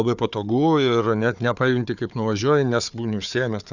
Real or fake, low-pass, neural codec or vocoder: fake; 7.2 kHz; codec, 16 kHz, 4 kbps, FunCodec, trained on Chinese and English, 50 frames a second